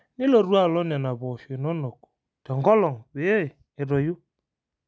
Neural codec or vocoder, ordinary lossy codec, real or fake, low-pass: none; none; real; none